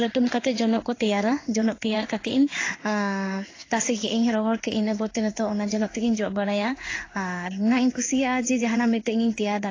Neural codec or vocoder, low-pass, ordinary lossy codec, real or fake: codec, 16 kHz in and 24 kHz out, 1 kbps, XY-Tokenizer; 7.2 kHz; AAC, 32 kbps; fake